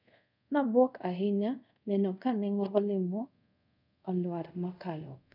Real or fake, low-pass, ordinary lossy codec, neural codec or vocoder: fake; 5.4 kHz; none; codec, 24 kHz, 0.5 kbps, DualCodec